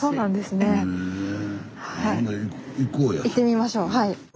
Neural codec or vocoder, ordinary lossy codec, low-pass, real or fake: none; none; none; real